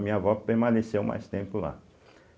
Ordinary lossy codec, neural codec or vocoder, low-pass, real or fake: none; none; none; real